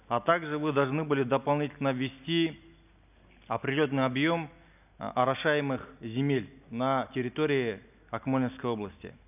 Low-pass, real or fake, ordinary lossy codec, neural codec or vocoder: 3.6 kHz; real; none; none